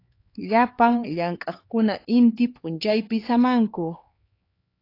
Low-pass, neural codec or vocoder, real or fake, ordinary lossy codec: 5.4 kHz; codec, 16 kHz, 4 kbps, X-Codec, HuBERT features, trained on LibriSpeech; fake; AAC, 32 kbps